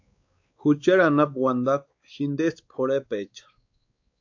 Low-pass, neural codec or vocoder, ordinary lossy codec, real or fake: 7.2 kHz; codec, 16 kHz, 4 kbps, X-Codec, WavLM features, trained on Multilingual LibriSpeech; AAC, 48 kbps; fake